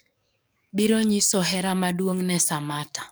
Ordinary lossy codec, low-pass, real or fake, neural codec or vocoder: none; none; fake; codec, 44.1 kHz, 7.8 kbps, DAC